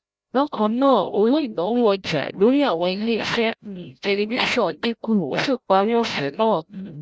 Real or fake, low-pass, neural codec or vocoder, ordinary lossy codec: fake; none; codec, 16 kHz, 0.5 kbps, FreqCodec, larger model; none